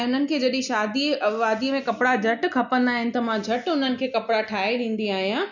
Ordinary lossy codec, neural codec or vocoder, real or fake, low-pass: none; none; real; 7.2 kHz